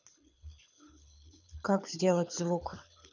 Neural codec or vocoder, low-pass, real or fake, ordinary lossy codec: codec, 24 kHz, 6 kbps, HILCodec; 7.2 kHz; fake; none